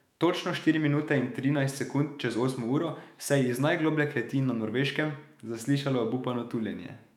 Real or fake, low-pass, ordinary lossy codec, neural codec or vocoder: fake; 19.8 kHz; none; autoencoder, 48 kHz, 128 numbers a frame, DAC-VAE, trained on Japanese speech